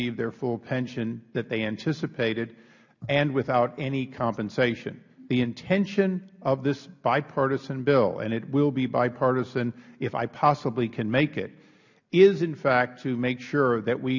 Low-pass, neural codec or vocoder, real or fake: 7.2 kHz; none; real